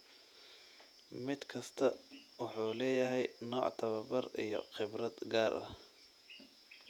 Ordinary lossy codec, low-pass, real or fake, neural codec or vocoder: none; 19.8 kHz; fake; vocoder, 48 kHz, 128 mel bands, Vocos